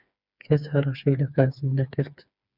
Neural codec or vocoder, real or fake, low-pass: codec, 16 kHz, 4 kbps, FreqCodec, smaller model; fake; 5.4 kHz